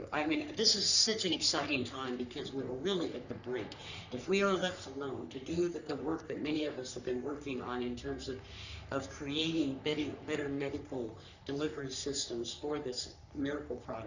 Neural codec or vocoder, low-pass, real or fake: codec, 44.1 kHz, 3.4 kbps, Pupu-Codec; 7.2 kHz; fake